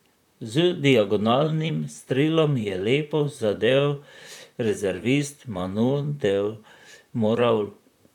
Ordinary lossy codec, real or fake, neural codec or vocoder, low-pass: none; fake; vocoder, 44.1 kHz, 128 mel bands, Pupu-Vocoder; 19.8 kHz